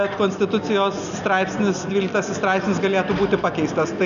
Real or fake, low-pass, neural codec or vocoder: real; 7.2 kHz; none